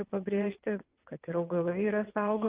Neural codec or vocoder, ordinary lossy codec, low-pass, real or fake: vocoder, 22.05 kHz, 80 mel bands, WaveNeXt; Opus, 32 kbps; 3.6 kHz; fake